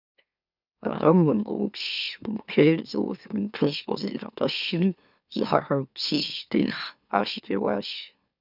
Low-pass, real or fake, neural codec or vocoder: 5.4 kHz; fake; autoencoder, 44.1 kHz, a latent of 192 numbers a frame, MeloTTS